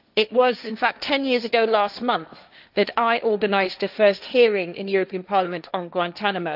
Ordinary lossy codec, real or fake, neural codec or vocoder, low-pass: none; fake; codec, 16 kHz, 1.1 kbps, Voila-Tokenizer; 5.4 kHz